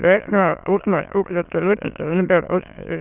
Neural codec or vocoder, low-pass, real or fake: autoencoder, 22.05 kHz, a latent of 192 numbers a frame, VITS, trained on many speakers; 3.6 kHz; fake